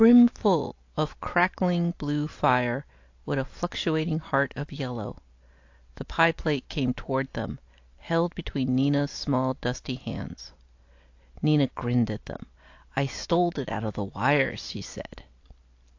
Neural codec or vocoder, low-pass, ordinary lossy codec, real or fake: none; 7.2 kHz; AAC, 48 kbps; real